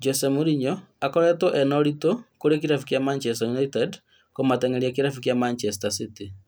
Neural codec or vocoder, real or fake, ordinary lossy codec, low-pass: none; real; none; none